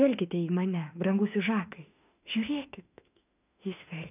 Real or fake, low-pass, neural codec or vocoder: fake; 3.6 kHz; autoencoder, 48 kHz, 32 numbers a frame, DAC-VAE, trained on Japanese speech